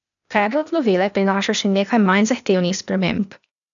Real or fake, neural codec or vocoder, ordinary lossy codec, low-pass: fake; codec, 16 kHz, 0.8 kbps, ZipCodec; none; 7.2 kHz